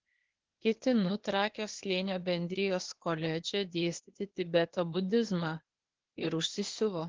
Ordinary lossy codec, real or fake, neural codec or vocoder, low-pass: Opus, 16 kbps; fake; codec, 16 kHz, 0.8 kbps, ZipCodec; 7.2 kHz